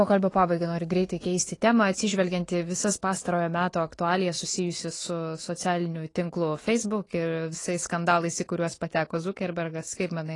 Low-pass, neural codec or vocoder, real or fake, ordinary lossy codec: 10.8 kHz; autoencoder, 48 kHz, 128 numbers a frame, DAC-VAE, trained on Japanese speech; fake; AAC, 32 kbps